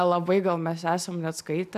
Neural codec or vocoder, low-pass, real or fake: none; 14.4 kHz; real